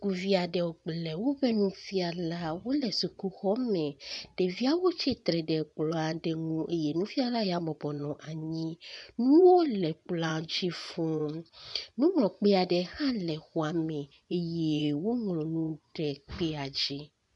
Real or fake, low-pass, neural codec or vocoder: fake; 10.8 kHz; vocoder, 24 kHz, 100 mel bands, Vocos